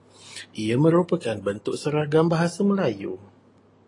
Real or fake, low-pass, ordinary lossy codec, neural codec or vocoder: real; 10.8 kHz; AAC, 32 kbps; none